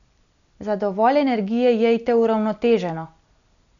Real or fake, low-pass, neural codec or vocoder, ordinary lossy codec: real; 7.2 kHz; none; none